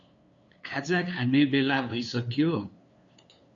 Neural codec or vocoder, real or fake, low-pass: codec, 16 kHz, 2 kbps, FunCodec, trained on LibriTTS, 25 frames a second; fake; 7.2 kHz